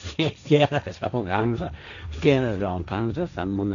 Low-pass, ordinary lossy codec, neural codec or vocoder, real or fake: 7.2 kHz; none; codec, 16 kHz, 1.1 kbps, Voila-Tokenizer; fake